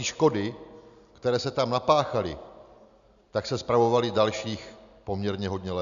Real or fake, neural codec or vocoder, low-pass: real; none; 7.2 kHz